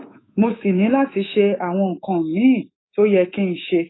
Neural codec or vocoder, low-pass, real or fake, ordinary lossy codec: codec, 24 kHz, 3.1 kbps, DualCodec; 7.2 kHz; fake; AAC, 16 kbps